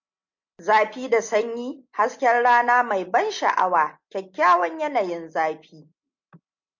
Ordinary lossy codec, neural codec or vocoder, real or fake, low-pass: MP3, 48 kbps; none; real; 7.2 kHz